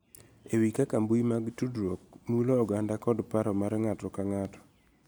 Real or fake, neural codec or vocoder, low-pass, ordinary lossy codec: real; none; none; none